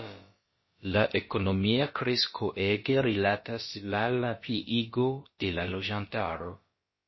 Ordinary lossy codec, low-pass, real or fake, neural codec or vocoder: MP3, 24 kbps; 7.2 kHz; fake; codec, 16 kHz, about 1 kbps, DyCAST, with the encoder's durations